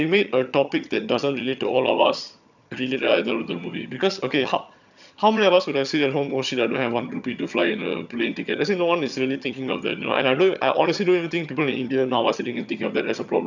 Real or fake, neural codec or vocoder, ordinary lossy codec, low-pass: fake; vocoder, 22.05 kHz, 80 mel bands, HiFi-GAN; none; 7.2 kHz